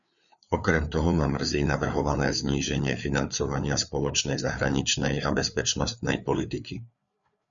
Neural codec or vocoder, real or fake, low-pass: codec, 16 kHz, 4 kbps, FreqCodec, larger model; fake; 7.2 kHz